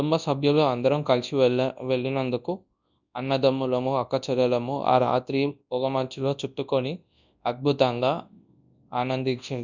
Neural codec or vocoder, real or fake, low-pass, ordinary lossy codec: codec, 24 kHz, 0.9 kbps, WavTokenizer, large speech release; fake; 7.2 kHz; none